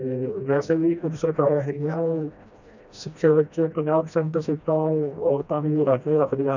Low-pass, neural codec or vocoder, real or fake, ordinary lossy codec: 7.2 kHz; codec, 16 kHz, 1 kbps, FreqCodec, smaller model; fake; none